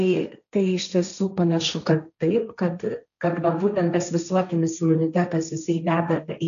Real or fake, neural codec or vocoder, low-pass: fake; codec, 16 kHz, 1.1 kbps, Voila-Tokenizer; 7.2 kHz